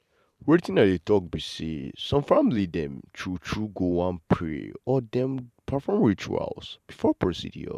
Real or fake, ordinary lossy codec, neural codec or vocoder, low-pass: fake; none; vocoder, 44.1 kHz, 128 mel bands every 512 samples, BigVGAN v2; 14.4 kHz